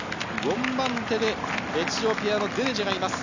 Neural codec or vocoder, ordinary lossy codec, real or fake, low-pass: none; none; real; 7.2 kHz